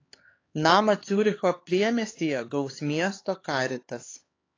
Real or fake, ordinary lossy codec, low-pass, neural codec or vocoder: fake; AAC, 32 kbps; 7.2 kHz; codec, 16 kHz, 4 kbps, X-Codec, HuBERT features, trained on LibriSpeech